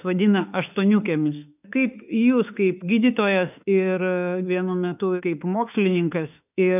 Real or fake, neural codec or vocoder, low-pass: fake; autoencoder, 48 kHz, 32 numbers a frame, DAC-VAE, trained on Japanese speech; 3.6 kHz